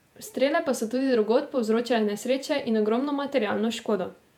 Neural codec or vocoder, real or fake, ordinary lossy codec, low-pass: vocoder, 48 kHz, 128 mel bands, Vocos; fake; MP3, 96 kbps; 19.8 kHz